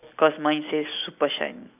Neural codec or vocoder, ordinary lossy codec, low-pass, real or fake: none; none; 3.6 kHz; real